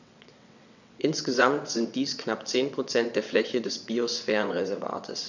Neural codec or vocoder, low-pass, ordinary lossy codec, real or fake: vocoder, 22.05 kHz, 80 mel bands, WaveNeXt; 7.2 kHz; none; fake